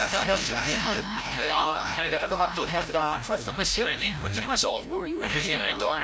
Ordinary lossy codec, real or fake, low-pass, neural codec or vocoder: none; fake; none; codec, 16 kHz, 0.5 kbps, FreqCodec, larger model